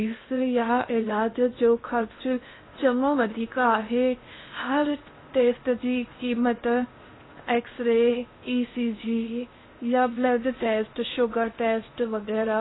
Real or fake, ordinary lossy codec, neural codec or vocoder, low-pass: fake; AAC, 16 kbps; codec, 16 kHz in and 24 kHz out, 0.6 kbps, FocalCodec, streaming, 2048 codes; 7.2 kHz